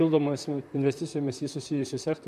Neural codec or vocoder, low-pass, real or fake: vocoder, 44.1 kHz, 128 mel bands, Pupu-Vocoder; 14.4 kHz; fake